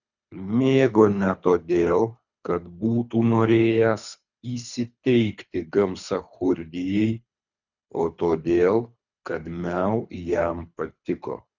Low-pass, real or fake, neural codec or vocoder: 7.2 kHz; fake; codec, 24 kHz, 3 kbps, HILCodec